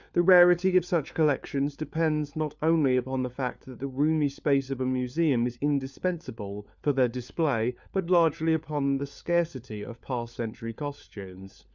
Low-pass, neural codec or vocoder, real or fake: 7.2 kHz; codec, 16 kHz, 4 kbps, FunCodec, trained on LibriTTS, 50 frames a second; fake